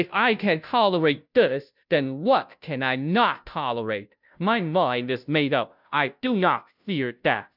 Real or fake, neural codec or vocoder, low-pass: fake; codec, 16 kHz, 0.5 kbps, FunCodec, trained on Chinese and English, 25 frames a second; 5.4 kHz